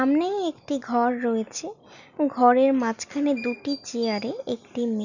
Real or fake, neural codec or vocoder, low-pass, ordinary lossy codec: real; none; 7.2 kHz; none